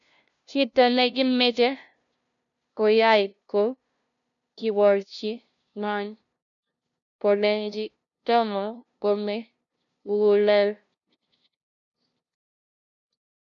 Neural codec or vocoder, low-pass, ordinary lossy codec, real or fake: codec, 16 kHz, 0.5 kbps, FunCodec, trained on LibriTTS, 25 frames a second; 7.2 kHz; none; fake